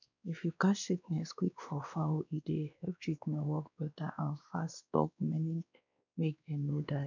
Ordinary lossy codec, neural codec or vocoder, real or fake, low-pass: none; codec, 16 kHz, 2 kbps, X-Codec, WavLM features, trained on Multilingual LibriSpeech; fake; 7.2 kHz